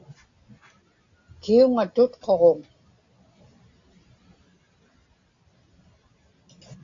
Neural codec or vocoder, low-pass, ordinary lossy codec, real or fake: none; 7.2 kHz; AAC, 48 kbps; real